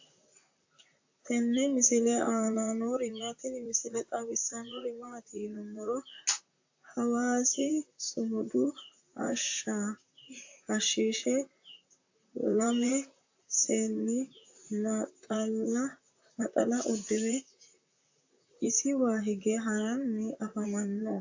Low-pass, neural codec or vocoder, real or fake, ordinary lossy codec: 7.2 kHz; vocoder, 44.1 kHz, 128 mel bands, Pupu-Vocoder; fake; AAC, 48 kbps